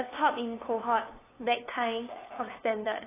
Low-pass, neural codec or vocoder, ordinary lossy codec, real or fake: 3.6 kHz; codec, 16 kHz, 2 kbps, FunCodec, trained on LibriTTS, 25 frames a second; AAC, 16 kbps; fake